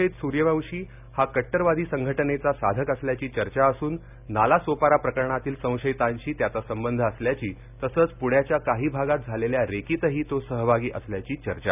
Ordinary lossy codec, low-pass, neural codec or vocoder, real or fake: none; 3.6 kHz; none; real